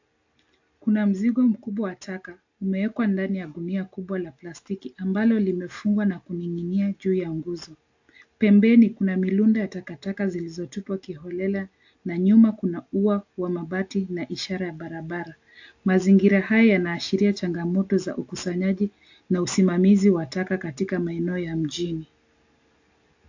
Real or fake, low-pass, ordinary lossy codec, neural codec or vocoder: real; 7.2 kHz; AAC, 48 kbps; none